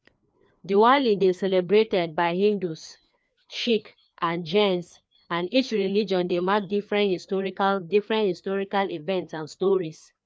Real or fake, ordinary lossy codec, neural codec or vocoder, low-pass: fake; none; codec, 16 kHz, 2 kbps, FreqCodec, larger model; none